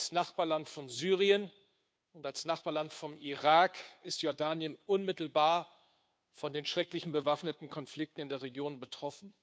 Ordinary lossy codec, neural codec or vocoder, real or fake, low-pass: none; codec, 16 kHz, 2 kbps, FunCodec, trained on Chinese and English, 25 frames a second; fake; none